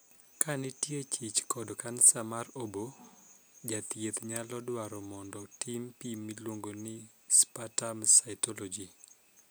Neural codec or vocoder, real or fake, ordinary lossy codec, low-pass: none; real; none; none